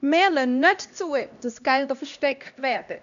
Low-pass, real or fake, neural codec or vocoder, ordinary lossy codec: 7.2 kHz; fake; codec, 16 kHz, 1 kbps, X-Codec, HuBERT features, trained on LibriSpeech; none